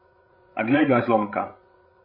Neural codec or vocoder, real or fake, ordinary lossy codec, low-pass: codec, 16 kHz, 16 kbps, FreqCodec, larger model; fake; MP3, 24 kbps; 5.4 kHz